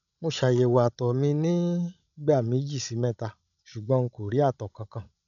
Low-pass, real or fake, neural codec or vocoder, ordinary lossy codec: 7.2 kHz; fake; codec, 16 kHz, 16 kbps, FreqCodec, larger model; MP3, 96 kbps